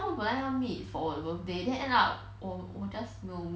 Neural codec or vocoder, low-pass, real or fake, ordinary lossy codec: none; none; real; none